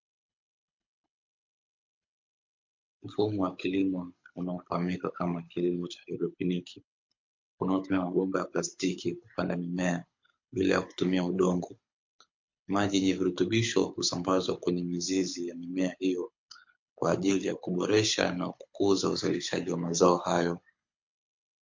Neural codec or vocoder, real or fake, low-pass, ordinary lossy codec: codec, 24 kHz, 6 kbps, HILCodec; fake; 7.2 kHz; MP3, 48 kbps